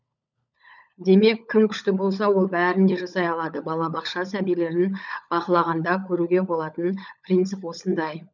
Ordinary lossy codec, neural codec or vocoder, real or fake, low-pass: none; codec, 16 kHz, 16 kbps, FunCodec, trained on LibriTTS, 50 frames a second; fake; 7.2 kHz